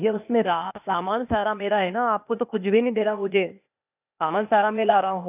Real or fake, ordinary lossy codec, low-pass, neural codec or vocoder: fake; none; 3.6 kHz; codec, 16 kHz, 0.8 kbps, ZipCodec